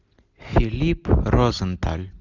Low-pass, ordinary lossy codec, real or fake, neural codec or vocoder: 7.2 kHz; Opus, 64 kbps; real; none